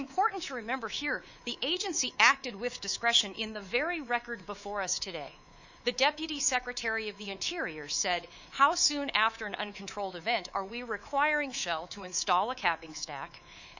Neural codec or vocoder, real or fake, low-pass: codec, 24 kHz, 3.1 kbps, DualCodec; fake; 7.2 kHz